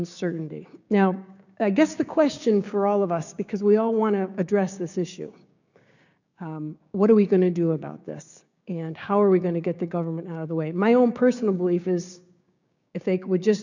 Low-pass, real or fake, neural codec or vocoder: 7.2 kHz; fake; codec, 16 kHz, 6 kbps, DAC